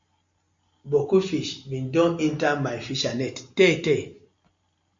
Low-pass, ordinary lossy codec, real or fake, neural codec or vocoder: 7.2 kHz; MP3, 48 kbps; real; none